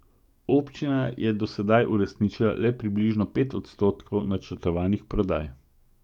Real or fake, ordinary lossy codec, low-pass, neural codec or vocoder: fake; none; 19.8 kHz; codec, 44.1 kHz, 7.8 kbps, DAC